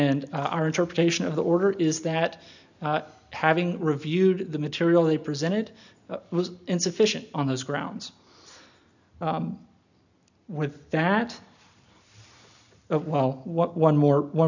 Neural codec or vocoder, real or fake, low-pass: none; real; 7.2 kHz